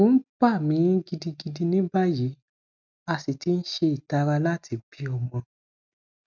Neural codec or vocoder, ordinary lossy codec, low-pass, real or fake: none; none; 7.2 kHz; real